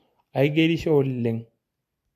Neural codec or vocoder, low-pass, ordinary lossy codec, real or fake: none; 14.4 kHz; MP3, 64 kbps; real